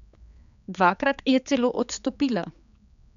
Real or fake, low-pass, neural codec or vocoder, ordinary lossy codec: fake; 7.2 kHz; codec, 16 kHz, 4 kbps, X-Codec, HuBERT features, trained on general audio; none